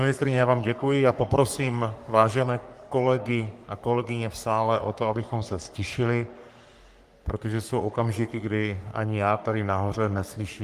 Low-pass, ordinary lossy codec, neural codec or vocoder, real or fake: 14.4 kHz; Opus, 32 kbps; codec, 44.1 kHz, 3.4 kbps, Pupu-Codec; fake